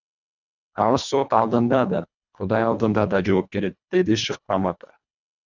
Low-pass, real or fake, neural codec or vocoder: 7.2 kHz; fake; codec, 24 kHz, 1.5 kbps, HILCodec